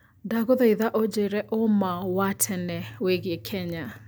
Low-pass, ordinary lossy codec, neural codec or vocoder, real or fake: none; none; none; real